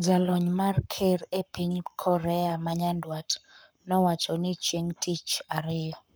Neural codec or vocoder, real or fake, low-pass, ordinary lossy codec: codec, 44.1 kHz, 7.8 kbps, Pupu-Codec; fake; none; none